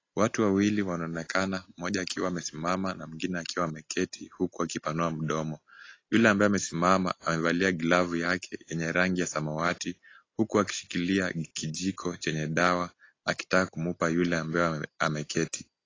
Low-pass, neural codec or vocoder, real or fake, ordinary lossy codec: 7.2 kHz; none; real; AAC, 32 kbps